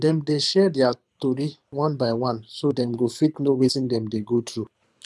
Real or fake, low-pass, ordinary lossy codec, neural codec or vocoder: fake; none; none; codec, 24 kHz, 6 kbps, HILCodec